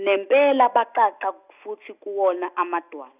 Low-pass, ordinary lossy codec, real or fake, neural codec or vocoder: 3.6 kHz; none; real; none